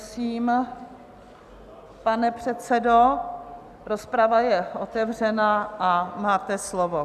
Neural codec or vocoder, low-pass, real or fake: none; 14.4 kHz; real